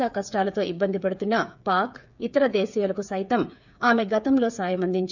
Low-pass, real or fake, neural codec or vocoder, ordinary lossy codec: 7.2 kHz; fake; codec, 16 kHz, 8 kbps, FreqCodec, smaller model; none